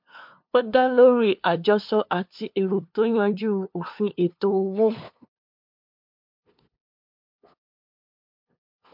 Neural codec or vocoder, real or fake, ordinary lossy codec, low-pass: codec, 16 kHz, 2 kbps, FunCodec, trained on LibriTTS, 25 frames a second; fake; MP3, 48 kbps; 5.4 kHz